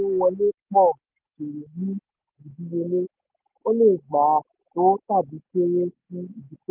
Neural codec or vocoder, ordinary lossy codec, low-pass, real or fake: none; Opus, 32 kbps; 3.6 kHz; real